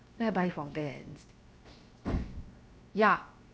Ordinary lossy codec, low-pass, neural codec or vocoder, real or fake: none; none; codec, 16 kHz, 0.7 kbps, FocalCodec; fake